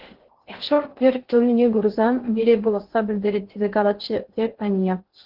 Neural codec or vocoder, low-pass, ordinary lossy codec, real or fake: codec, 16 kHz in and 24 kHz out, 0.6 kbps, FocalCodec, streaming, 2048 codes; 5.4 kHz; Opus, 16 kbps; fake